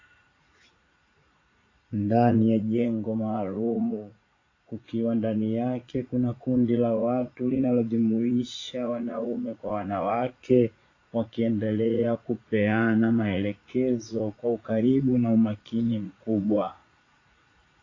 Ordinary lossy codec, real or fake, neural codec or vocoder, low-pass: AAC, 32 kbps; fake; vocoder, 44.1 kHz, 80 mel bands, Vocos; 7.2 kHz